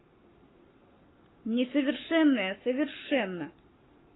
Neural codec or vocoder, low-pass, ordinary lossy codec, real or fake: codec, 44.1 kHz, 7.8 kbps, Pupu-Codec; 7.2 kHz; AAC, 16 kbps; fake